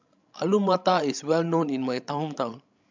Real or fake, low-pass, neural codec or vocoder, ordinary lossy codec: fake; 7.2 kHz; codec, 16 kHz, 16 kbps, FreqCodec, larger model; MP3, 64 kbps